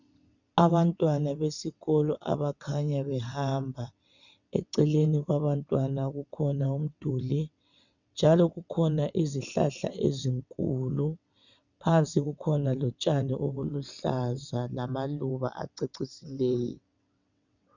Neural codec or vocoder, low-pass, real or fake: vocoder, 22.05 kHz, 80 mel bands, WaveNeXt; 7.2 kHz; fake